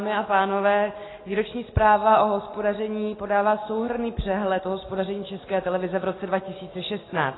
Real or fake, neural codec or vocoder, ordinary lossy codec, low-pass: real; none; AAC, 16 kbps; 7.2 kHz